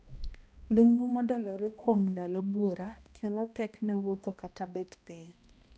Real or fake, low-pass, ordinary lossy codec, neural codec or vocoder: fake; none; none; codec, 16 kHz, 1 kbps, X-Codec, HuBERT features, trained on balanced general audio